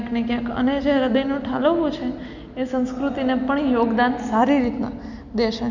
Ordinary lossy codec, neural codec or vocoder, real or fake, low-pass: none; none; real; 7.2 kHz